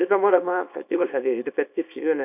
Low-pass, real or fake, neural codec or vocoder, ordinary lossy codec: 3.6 kHz; fake; codec, 24 kHz, 0.9 kbps, WavTokenizer, small release; none